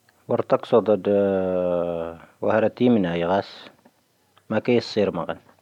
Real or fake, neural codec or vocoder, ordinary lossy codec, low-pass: real; none; none; 19.8 kHz